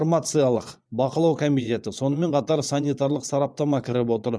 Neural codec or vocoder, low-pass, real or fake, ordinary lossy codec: vocoder, 22.05 kHz, 80 mel bands, Vocos; none; fake; none